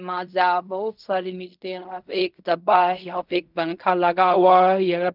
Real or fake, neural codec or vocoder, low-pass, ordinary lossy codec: fake; codec, 16 kHz in and 24 kHz out, 0.4 kbps, LongCat-Audio-Codec, fine tuned four codebook decoder; 5.4 kHz; none